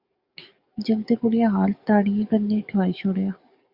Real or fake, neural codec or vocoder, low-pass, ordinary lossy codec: fake; codec, 44.1 kHz, 7.8 kbps, DAC; 5.4 kHz; Opus, 64 kbps